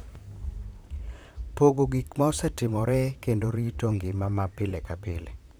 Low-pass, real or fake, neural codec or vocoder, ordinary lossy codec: none; fake; vocoder, 44.1 kHz, 128 mel bands, Pupu-Vocoder; none